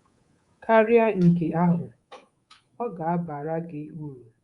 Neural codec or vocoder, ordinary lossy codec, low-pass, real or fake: codec, 24 kHz, 3.1 kbps, DualCodec; Opus, 64 kbps; 10.8 kHz; fake